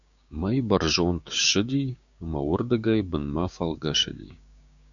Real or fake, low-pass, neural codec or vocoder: fake; 7.2 kHz; codec, 16 kHz, 6 kbps, DAC